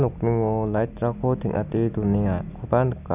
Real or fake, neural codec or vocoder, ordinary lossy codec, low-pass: real; none; none; 3.6 kHz